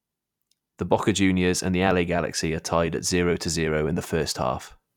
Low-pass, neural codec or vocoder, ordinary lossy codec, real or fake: 19.8 kHz; vocoder, 44.1 kHz, 128 mel bands every 256 samples, BigVGAN v2; none; fake